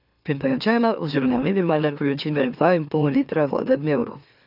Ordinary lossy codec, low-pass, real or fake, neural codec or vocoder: none; 5.4 kHz; fake; autoencoder, 44.1 kHz, a latent of 192 numbers a frame, MeloTTS